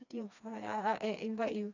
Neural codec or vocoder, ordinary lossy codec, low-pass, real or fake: codec, 16 kHz, 2 kbps, FreqCodec, smaller model; none; 7.2 kHz; fake